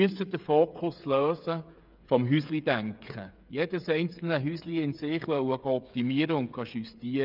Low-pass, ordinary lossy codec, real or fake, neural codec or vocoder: 5.4 kHz; none; fake; codec, 16 kHz, 8 kbps, FreqCodec, smaller model